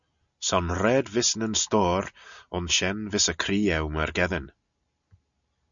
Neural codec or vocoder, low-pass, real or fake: none; 7.2 kHz; real